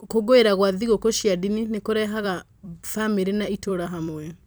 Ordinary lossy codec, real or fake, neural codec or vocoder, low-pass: none; fake; vocoder, 44.1 kHz, 128 mel bands every 256 samples, BigVGAN v2; none